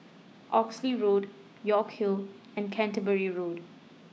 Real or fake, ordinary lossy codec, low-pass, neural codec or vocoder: fake; none; none; codec, 16 kHz, 6 kbps, DAC